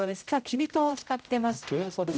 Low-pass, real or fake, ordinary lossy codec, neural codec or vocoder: none; fake; none; codec, 16 kHz, 0.5 kbps, X-Codec, HuBERT features, trained on general audio